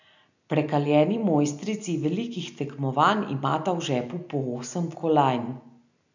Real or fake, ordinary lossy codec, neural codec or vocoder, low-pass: real; none; none; 7.2 kHz